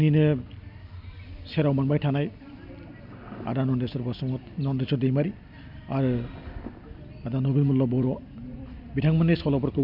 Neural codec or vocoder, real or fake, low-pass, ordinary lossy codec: none; real; 5.4 kHz; none